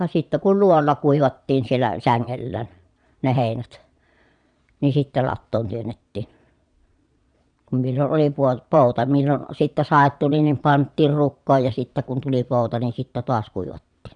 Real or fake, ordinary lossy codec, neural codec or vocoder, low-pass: fake; none; vocoder, 22.05 kHz, 80 mel bands, Vocos; 9.9 kHz